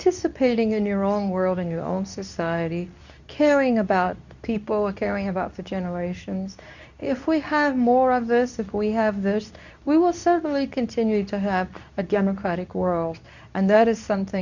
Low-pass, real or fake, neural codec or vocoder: 7.2 kHz; fake; codec, 24 kHz, 0.9 kbps, WavTokenizer, medium speech release version 2